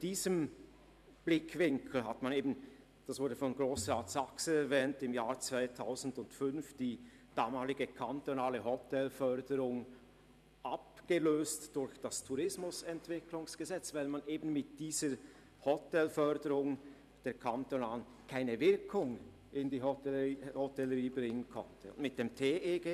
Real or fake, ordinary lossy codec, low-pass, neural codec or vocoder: fake; none; 14.4 kHz; vocoder, 44.1 kHz, 128 mel bands every 512 samples, BigVGAN v2